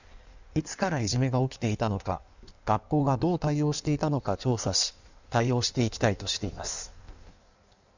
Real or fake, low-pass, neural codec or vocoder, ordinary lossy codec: fake; 7.2 kHz; codec, 16 kHz in and 24 kHz out, 1.1 kbps, FireRedTTS-2 codec; none